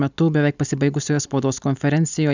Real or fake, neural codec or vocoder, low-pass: real; none; 7.2 kHz